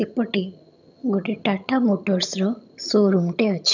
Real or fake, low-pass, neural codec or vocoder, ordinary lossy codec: fake; 7.2 kHz; vocoder, 22.05 kHz, 80 mel bands, HiFi-GAN; none